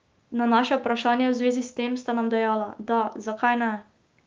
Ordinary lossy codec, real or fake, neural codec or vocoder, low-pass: Opus, 24 kbps; fake; codec, 16 kHz, 6 kbps, DAC; 7.2 kHz